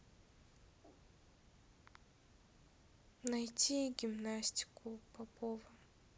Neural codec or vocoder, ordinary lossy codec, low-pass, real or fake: none; none; none; real